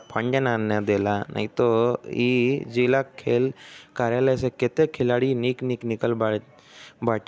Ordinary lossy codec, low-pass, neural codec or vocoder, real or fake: none; none; none; real